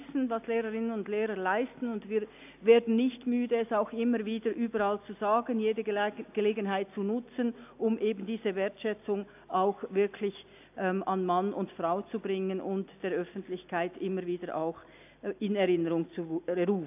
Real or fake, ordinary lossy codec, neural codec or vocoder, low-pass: real; AAC, 32 kbps; none; 3.6 kHz